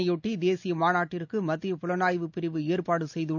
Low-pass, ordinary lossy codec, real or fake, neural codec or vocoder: 7.2 kHz; none; real; none